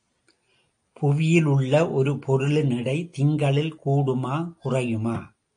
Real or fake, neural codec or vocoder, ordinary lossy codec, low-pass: real; none; AAC, 48 kbps; 9.9 kHz